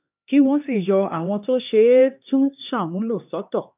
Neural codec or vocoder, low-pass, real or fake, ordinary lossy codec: codec, 16 kHz, 2 kbps, X-Codec, HuBERT features, trained on LibriSpeech; 3.6 kHz; fake; none